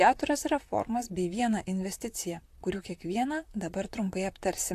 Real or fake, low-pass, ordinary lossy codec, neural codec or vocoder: fake; 14.4 kHz; AAC, 64 kbps; vocoder, 44.1 kHz, 128 mel bands, Pupu-Vocoder